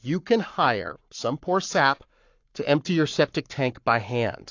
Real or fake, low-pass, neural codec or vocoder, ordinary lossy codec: fake; 7.2 kHz; codec, 44.1 kHz, 7.8 kbps, Pupu-Codec; AAC, 48 kbps